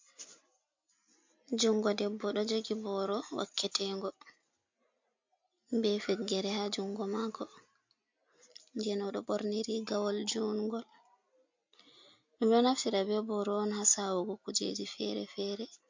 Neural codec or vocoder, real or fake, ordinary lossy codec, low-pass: none; real; MP3, 48 kbps; 7.2 kHz